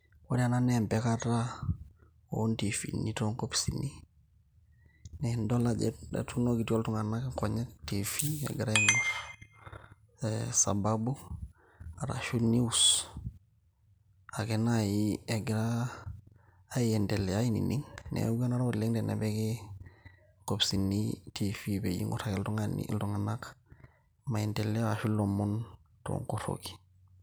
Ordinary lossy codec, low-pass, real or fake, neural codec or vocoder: none; none; real; none